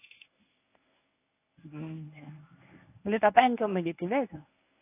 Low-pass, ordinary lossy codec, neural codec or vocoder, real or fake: 3.6 kHz; AAC, 24 kbps; codec, 24 kHz, 0.9 kbps, WavTokenizer, medium speech release version 1; fake